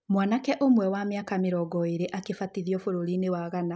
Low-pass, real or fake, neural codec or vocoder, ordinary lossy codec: none; real; none; none